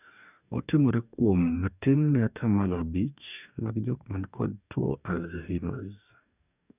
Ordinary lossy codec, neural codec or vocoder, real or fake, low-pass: none; codec, 44.1 kHz, 2.6 kbps, DAC; fake; 3.6 kHz